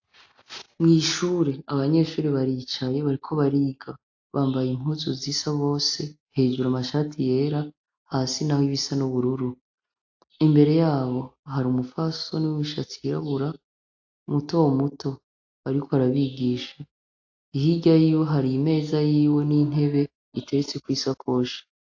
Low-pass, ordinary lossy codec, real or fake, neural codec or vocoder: 7.2 kHz; AAC, 48 kbps; real; none